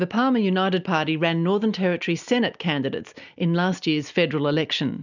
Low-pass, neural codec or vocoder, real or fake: 7.2 kHz; none; real